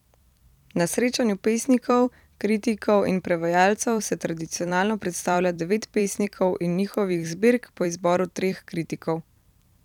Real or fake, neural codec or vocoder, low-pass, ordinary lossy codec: fake; vocoder, 44.1 kHz, 128 mel bands every 256 samples, BigVGAN v2; 19.8 kHz; none